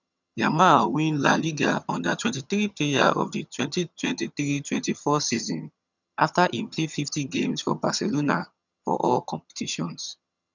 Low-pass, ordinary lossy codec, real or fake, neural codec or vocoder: 7.2 kHz; none; fake; vocoder, 22.05 kHz, 80 mel bands, HiFi-GAN